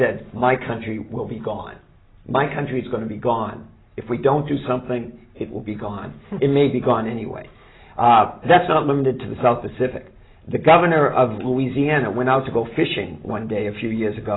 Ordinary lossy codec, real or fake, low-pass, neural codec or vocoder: AAC, 16 kbps; real; 7.2 kHz; none